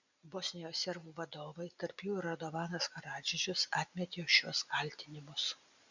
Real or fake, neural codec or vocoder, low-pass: real; none; 7.2 kHz